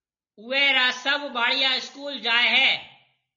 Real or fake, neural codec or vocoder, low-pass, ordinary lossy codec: real; none; 7.2 kHz; MP3, 32 kbps